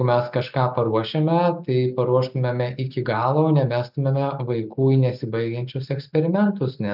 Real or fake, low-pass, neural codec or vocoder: real; 5.4 kHz; none